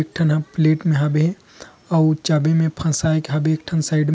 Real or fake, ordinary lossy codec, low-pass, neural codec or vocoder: real; none; none; none